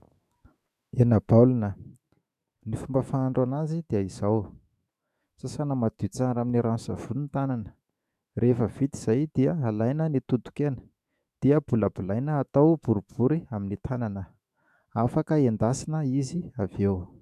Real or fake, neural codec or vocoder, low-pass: fake; autoencoder, 48 kHz, 128 numbers a frame, DAC-VAE, trained on Japanese speech; 14.4 kHz